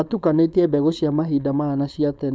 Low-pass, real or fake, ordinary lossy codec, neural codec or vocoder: none; fake; none; codec, 16 kHz, 4.8 kbps, FACodec